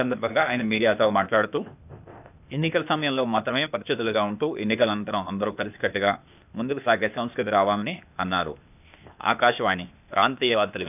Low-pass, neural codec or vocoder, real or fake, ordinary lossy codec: 3.6 kHz; codec, 16 kHz, 0.8 kbps, ZipCodec; fake; none